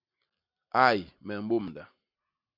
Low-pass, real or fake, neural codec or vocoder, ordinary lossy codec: 5.4 kHz; real; none; MP3, 48 kbps